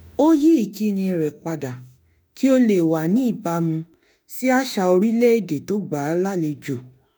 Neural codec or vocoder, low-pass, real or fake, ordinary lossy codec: autoencoder, 48 kHz, 32 numbers a frame, DAC-VAE, trained on Japanese speech; none; fake; none